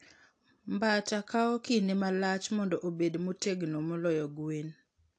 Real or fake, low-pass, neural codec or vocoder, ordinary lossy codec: real; 9.9 kHz; none; AAC, 48 kbps